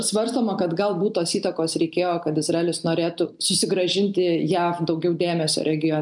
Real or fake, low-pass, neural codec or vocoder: real; 10.8 kHz; none